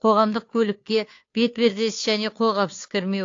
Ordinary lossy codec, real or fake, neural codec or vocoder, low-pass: AAC, 48 kbps; fake; codec, 16 kHz, 2 kbps, FunCodec, trained on Chinese and English, 25 frames a second; 7.2 kHz